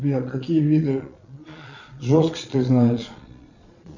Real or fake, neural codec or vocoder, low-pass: fake; vocoder, 22.05 kHz, 80 mel bands, Vocos; 7.2 kHz